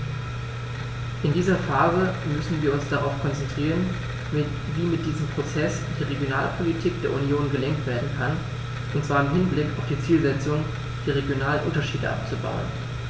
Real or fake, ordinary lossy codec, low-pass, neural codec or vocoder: real; none; none; none